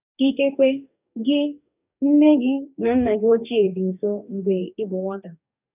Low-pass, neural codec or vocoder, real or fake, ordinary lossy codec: 3.6 kHz; codec, 44.1 kHz, 2.6 kbps, DAC; fake; none